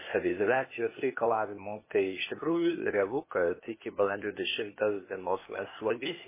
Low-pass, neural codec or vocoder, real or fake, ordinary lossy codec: 3.6 kHz; codec, 16 kHz, 0.8 kbps, ZipCodec; fake; MP3, 16 kbps